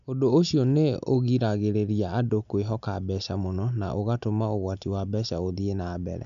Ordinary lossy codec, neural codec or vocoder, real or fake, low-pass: none; none; real; 7.2 kHz